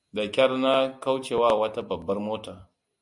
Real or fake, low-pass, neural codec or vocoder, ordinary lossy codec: real; 10.8 kHz; none; MP3, 96 kbps